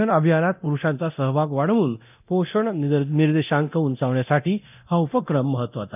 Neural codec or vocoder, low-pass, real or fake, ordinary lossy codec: codec, 24 kHz, 0.9 kbps, DualCodec; 3.6 kHz; fake; none